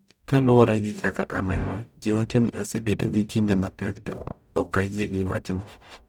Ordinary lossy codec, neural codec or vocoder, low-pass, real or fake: none; codec, 44.1 kHz, 0.9 kbps, DAC; 19.8 kHz; fake